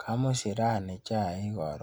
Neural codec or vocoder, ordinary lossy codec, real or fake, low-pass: none; none; real; none